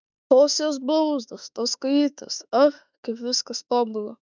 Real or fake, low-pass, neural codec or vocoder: fake; 7.2 kHz; autoencoder, 48 kHz, 32 numbers a frame, DAC-VAE, trained on Japanese speech